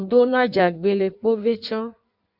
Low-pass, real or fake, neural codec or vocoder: 5.4 kHz; fake; codec, 16 kHz in and 24 kHz out, 1.1 kbps, FireRedTTS-2 codec